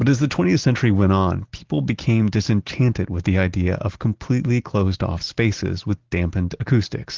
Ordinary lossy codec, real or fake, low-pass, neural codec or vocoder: Opus, 16 kbps; real; 7.2 kHz; none